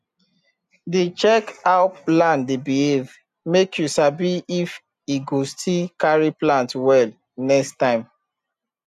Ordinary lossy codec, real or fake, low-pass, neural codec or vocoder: none; real; 14.4 kHz; none